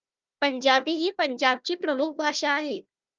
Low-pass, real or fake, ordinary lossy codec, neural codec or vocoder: 7.2 kHz; fake; Opus, 24 kbps; codec, 16 kHz, 1 kbps, FunCodec, trained on Chinese and English, 50 frames a second